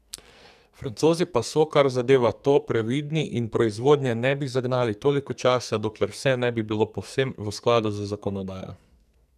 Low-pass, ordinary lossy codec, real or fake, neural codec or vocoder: 14.4 kHz; none; fake; codec, 44.1 kHz, 2.6 kbps, SNAC